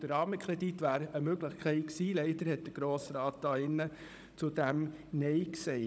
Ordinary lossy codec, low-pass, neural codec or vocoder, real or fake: none; none; codec, 16 kHz, 16 kbps, FunCodec, trained on Chinese and English, 50 frames a second; fake